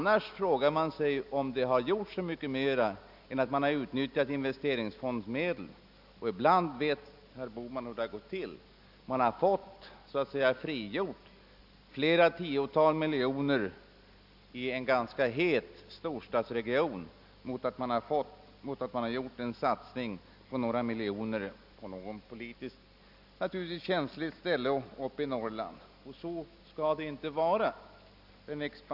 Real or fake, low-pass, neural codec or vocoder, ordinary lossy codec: real; 5.4 kHz; none; none